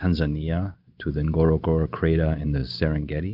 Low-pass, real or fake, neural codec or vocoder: 5.4 kHz; real; none